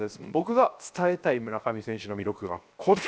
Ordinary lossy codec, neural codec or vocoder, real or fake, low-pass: none; codec, 16 kHz, 0.7 kbps, FocalCodec; fake; none